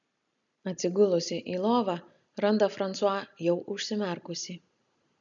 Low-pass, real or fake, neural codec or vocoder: 7.2 kHz; real; none